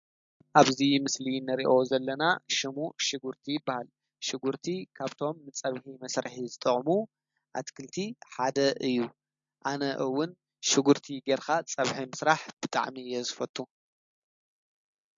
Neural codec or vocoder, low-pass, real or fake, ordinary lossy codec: none; 7.2 kHz; real; MP3, 48 kbps